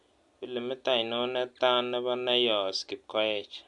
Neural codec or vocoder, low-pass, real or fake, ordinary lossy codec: none; 10.8 kHz; real; MP3, 64 kbps